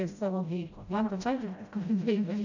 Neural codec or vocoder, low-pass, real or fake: codec, 16 kHz, 0.5 kbps, FreqCodec, smaller model; 7.2 kHz; fake